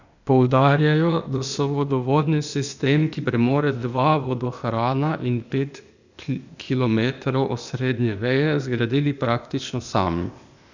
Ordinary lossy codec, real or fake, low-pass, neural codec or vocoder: none; fake; 7.2 kHz; codec, 16 kHz, 0.8 kbps, ZipCodec